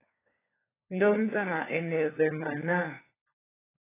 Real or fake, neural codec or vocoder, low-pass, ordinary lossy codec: fake; codec, 16 kHz, 4 kbps, FunCodec, trained on LibriTTS, 50 frames a second; 3.6 kHz; AAC, 16 kbps